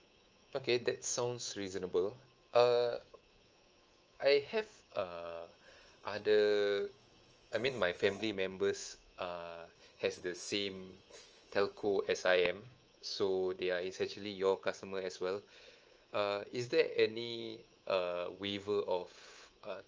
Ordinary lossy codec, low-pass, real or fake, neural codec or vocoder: Opus, 24 kbps; 7.2 kHz; fake; codec, 24 kHz, 3.1 kbps, DualCodec